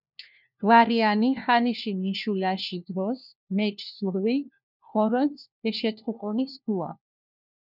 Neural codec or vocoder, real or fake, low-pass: codec, 16 kHz, 1 kbps, FunCodec, trained on LibriTTS, 50 frames a second; fake; 5.4 kHz